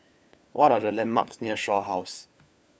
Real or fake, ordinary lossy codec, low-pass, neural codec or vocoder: fake; none; none; codec, 16 kHz, 4 kbps, FunCodec, trained on LibriTTS, 50 frames a second